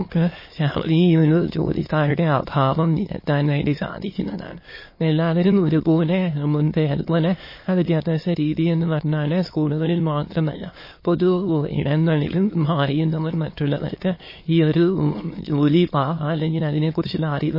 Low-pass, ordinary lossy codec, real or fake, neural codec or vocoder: 5.4 kHz; MP3, 24 kbps; fake; autoencoder, 22.05 kHz, a latent of 192 numbers a frame, VITS, trained on many speakers